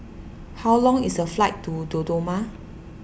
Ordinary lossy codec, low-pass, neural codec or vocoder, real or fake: none; none; none; real